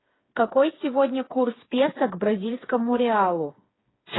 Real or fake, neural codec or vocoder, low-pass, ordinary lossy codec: fake; codec, 16 kHz, 4 kbps, FreqCodec, smaller model; 7.2 kHz; AAC, 16 kbps